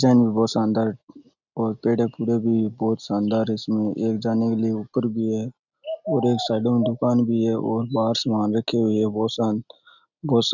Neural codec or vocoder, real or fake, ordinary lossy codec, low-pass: none; real; none; 7.2 kHz